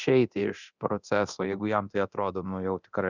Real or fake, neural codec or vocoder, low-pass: fake; codec, 24 kHz, 0.9 kbps, DualCodec; 7.2 kHz